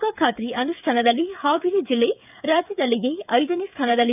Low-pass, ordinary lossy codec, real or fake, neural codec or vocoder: 3.6 kHz; none; fake; codec, 16 kHz, 8 kbps, FreqCodec, smaller model